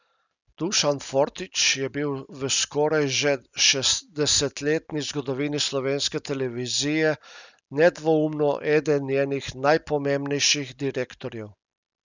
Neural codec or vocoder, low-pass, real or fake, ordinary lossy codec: none; 7.2 kHz; real; none